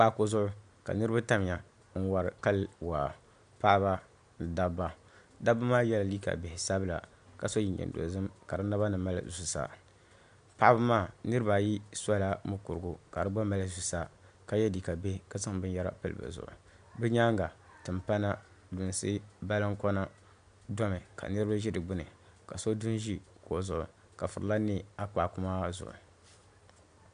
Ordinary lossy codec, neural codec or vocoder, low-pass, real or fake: AAC, 96 kbps; none; 9.9 kHz; real